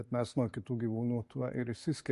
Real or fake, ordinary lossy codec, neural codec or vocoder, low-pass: real; MP3, 48 kbps; none; 14.4 kHz